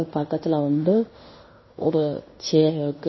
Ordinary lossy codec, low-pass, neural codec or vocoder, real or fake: MP3, 24 kbps; 7.2 kHz; codec, 16 kHz in and 24 kHz out, 0.9 kbps, LongCat-Audio-Codec, fine tuned four codebook decoder; fake